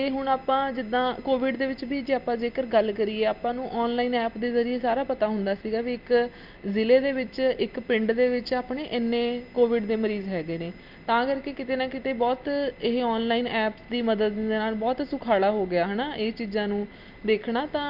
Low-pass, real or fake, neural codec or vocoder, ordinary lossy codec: 5.4 kHz; real; none; Opus, 32 kbps